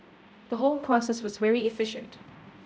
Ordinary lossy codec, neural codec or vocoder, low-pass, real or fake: none; codec, 16 kHz, 0.5 kbps, X-Codec, HuBERT features, trained on balanced general audio; none; fake